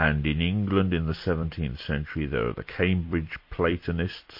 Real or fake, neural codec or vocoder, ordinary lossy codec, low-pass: fake; vocoder, 44.1 kHz, 128 mel bands every 256 samples, BigVGAN v2; MP3, 32 kbps; 5.4 kHz